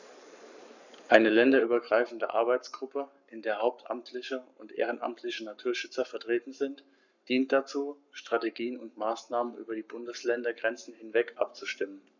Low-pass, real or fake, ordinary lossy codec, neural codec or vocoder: 7.2 kHz; fake; none; codec, 44.1 kHz, 7.8 kbps, Pupu-Codec